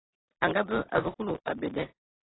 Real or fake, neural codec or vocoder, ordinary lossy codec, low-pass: real; none; AAC, 16 kbps; 7.2 kHz